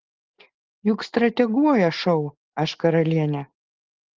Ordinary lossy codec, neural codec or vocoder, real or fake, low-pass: Opus, 16 kbps; none; real; 7.2 kHz